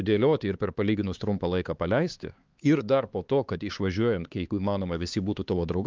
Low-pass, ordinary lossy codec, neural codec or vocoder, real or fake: 7.2 kHz; Opus, 32 kbps; codec, 16 kHz, 4 kbps, X-Codec, HuBERT features, trained on LibriSpeech; fake